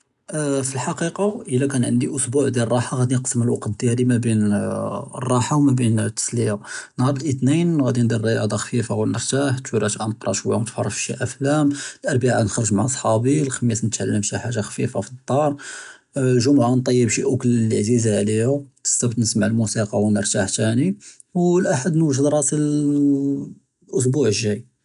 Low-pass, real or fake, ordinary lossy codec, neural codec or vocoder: 10.8 kHz; real; none; none